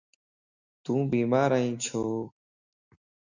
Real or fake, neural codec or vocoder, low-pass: real; none; 7.2 kHz